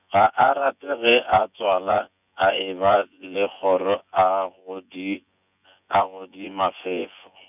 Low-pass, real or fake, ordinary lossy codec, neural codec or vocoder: 3.6 kHz; fake; none; vocoder, 24 kHz, 100 mel bands, Vocos